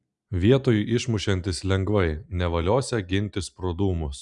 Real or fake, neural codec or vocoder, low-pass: real; none; 10.8 kHz